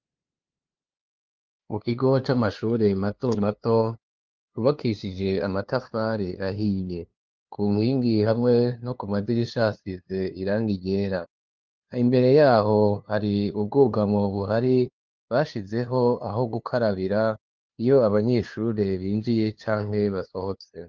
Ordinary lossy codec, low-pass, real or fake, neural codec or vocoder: Opus, 32 kbps; 7.2 kHz; fake; codec, 16 kHz, 2 kbps, FunCodec, trained on LibriTTS, 25 frames a second